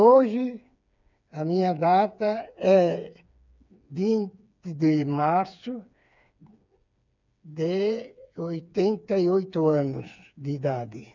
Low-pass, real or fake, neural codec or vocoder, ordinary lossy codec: 7.2 kHz; fake; codec, 16 kHz, 4 kbps, FreqCodec, smaller model; none